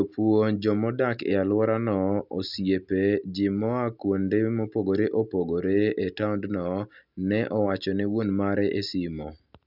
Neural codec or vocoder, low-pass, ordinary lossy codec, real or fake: none; 5.4 kHz; none; real